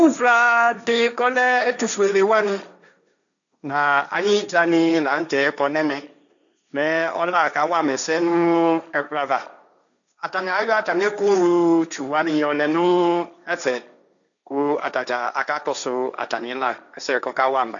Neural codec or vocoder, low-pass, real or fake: codec, 16 kHz, 1.1 kbps, Voila-Tokenizer; 7.2 kHz; fake